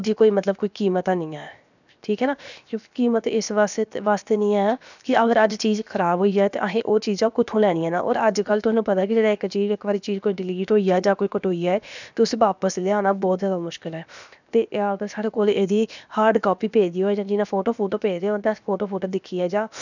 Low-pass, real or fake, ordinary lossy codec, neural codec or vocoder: 7.2 kHz; fake; none; codec, 16 kHz, 0.7 kbps, FocalCodec